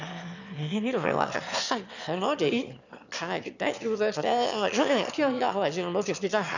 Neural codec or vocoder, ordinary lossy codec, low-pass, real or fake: autoencoder, 22.05 kHz, a latent of 192 numbers a frame, VITS, trained on one speaker; none; 7.2 kHz; fake